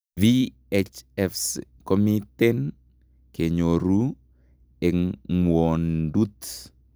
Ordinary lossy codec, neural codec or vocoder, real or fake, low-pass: none; none; real; none